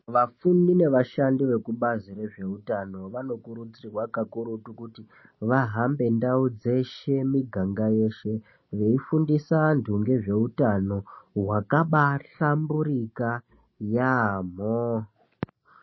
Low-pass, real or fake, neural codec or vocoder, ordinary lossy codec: 7.2 kHz; real; none; MP3, 24 kbps